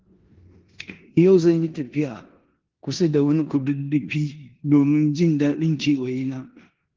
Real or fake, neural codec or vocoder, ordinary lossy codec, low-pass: fake; codec, 16 kHz in and 24 kHz out, 0.9 kbps, LongCat-Audio-Codec, four codebook decoder; Opus, 16 kbps; 7.2 kHz